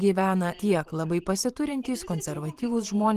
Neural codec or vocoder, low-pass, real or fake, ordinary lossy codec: vocoder, 44.1 kHz, 128 mel bands every 512 samples, BigVGAN v2; 14.4 kHz; fake; Opus, 24 kbps